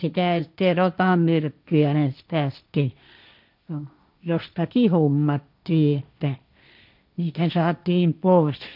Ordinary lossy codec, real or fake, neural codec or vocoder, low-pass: none; fake; codec, 16 kHz, 1.1 kbps, Voila-Tokenizer; 5.4 kHz